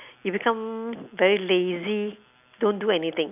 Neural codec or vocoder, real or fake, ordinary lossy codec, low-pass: none; real; none; 3.6 kHz